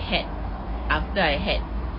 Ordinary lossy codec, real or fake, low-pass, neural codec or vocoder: MP3, 24 kbps; real; 5.4 kHz; none